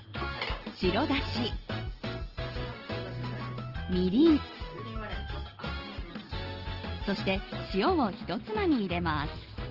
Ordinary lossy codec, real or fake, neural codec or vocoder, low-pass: Opus, 16 kbps; real; none; 5.4 kHz